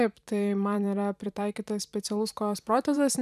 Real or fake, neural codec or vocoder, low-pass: fake; vocoder, 44.1 kHz, 128 mel bands every 512 samples, BigVGAN v2; 14.4 kHz